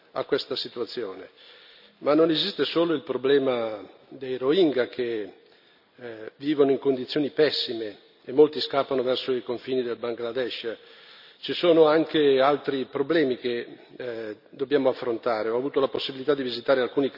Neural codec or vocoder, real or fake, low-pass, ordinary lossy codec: none; real; 5.4 kHz; none